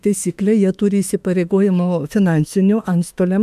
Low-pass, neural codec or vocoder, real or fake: 14.4 kHz; autoencoder, 48 kHz, 32 numbers a frame, DAC-VAE, trained on Japanese speech; fake